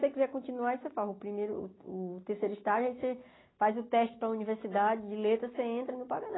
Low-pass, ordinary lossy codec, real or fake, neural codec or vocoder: 7.2 kHz; AAC, 16 kbps; real; none